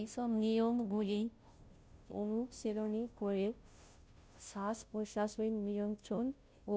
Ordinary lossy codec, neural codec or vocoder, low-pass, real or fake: none; codec, 16 kHz, 0.5 kbps, FunCodec, trained on Chinese and English, 25 frames a second; none; fake